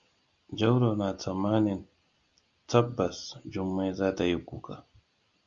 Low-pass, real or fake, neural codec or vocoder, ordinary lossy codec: 7.2 kHz; real; none; Opus, 64 kbps